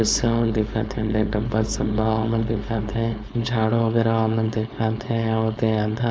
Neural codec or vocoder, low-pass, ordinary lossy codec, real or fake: codec, 16 kHz, 4.8 kbps, FACodec; none; none; fake